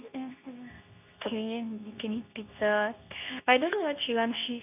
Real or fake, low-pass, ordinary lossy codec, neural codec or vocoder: fake; 3.6 kHz; none; codec, 24 kHz, 0.9 kbps, WavTokenizer, medium speech release version 2